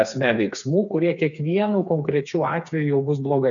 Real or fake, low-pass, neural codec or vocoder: fake; 7.2 kHz; codec, 16 kHz, 4 kbps, FreqCodec, smaller model